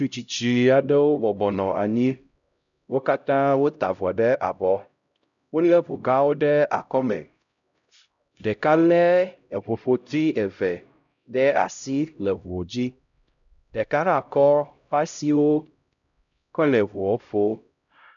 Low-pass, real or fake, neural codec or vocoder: 7.2 kHz; fake; codec, 16 kHz, 0.5 kbps, X-Codec, HuBERT features, trained on LibriSpeech